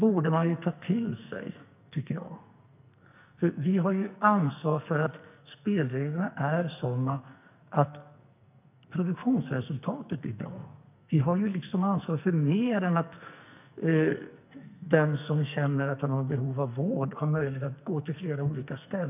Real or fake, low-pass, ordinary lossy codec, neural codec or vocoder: fake; 3.6 kHz; none; codec, 44.1 kHz, 2.6 kbps, SNAC